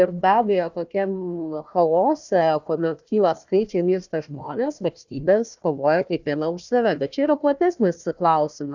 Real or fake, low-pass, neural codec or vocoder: fake; 7.2 kHz; codec, 16 kHz, 1 kbps, FunCodec, trained on Chinese and English, 50 frames a second